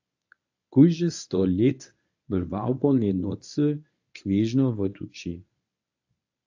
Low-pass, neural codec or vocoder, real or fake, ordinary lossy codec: 7.2 kHz; codec, 24 kHz, 0.9 kbps, WavTokenizer, medium speech release version 1; fake; AAC, 48 kbps